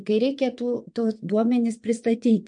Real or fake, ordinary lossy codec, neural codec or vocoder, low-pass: fake; MP3, 64 kbps; vocoder, 22.05 kHz, 80 mel bands, WaveNeXt; 9.9 kHz